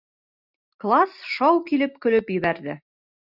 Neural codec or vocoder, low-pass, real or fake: none; 5.4 kHz; real